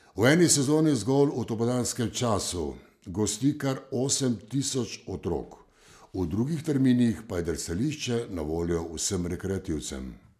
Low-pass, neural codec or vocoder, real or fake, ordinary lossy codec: 14.4 kHz; none; real; MP3, 96 kbps